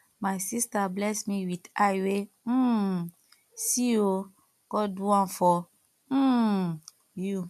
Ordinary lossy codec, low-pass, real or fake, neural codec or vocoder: MP3, 96 kbps; 14.4 kHz; real; none